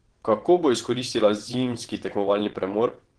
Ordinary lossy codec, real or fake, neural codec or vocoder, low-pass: Opus, 16 kbps; fake; vocoder, 22.05 kHz, 80 mel bands, WaveNeXt; 9.9 kHz